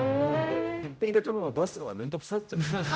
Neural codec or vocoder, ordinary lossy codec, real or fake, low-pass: codec, 16 kHz, 0.5 kbps, X-Codec, HuBERT features, trained on general audio; none; fake; none